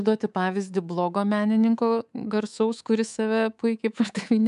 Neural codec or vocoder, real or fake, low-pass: codec, 24 kHz, 3.1 kbps, DualCodec; fake; 10.8 kHz